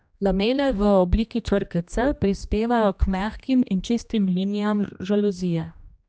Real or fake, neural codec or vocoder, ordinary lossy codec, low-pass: fake; codec, 16 kHz, 1 kbps, X-Codec, HuBERT features, trained on general audio; none; none